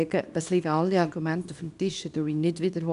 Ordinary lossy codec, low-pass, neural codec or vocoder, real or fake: none; 10.8 kHz; codec, 24 kHz, 0.9 kbps, WavTokenizer, small release; fake